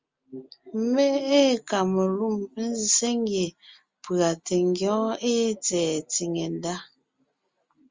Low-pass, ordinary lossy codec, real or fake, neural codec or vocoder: 7.2 kHz; Opus, 32 kbps; real; none